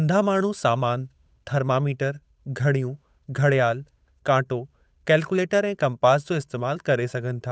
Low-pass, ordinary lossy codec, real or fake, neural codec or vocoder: none; none; fake; codec, 16 kHz, 8 kbps, FunCodec, trained on Chinese and English, 25 frames a second